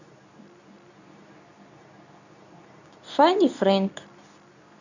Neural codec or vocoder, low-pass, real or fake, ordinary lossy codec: codec, 24 kHz, 0.9 kbps, WavTokenizer, medium speech release version 2; 7.2 kHz; fake; none